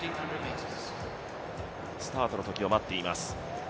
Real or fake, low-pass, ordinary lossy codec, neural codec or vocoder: real; none; none; none